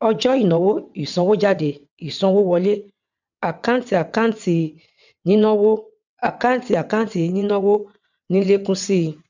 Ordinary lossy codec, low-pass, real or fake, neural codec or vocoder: none; 7.2 kHz; fake; vocoder, 22.05 kHz, 80 mel bands, WaveNeXt